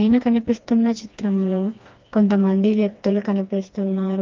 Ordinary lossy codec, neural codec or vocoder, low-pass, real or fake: Opus, 24 kbps; codec, 16 kHz, 2 kbps, FreqCodec, smaller model; 7.2 kHz; fake